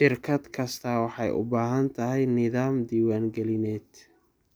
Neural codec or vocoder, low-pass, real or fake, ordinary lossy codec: none; none; real; none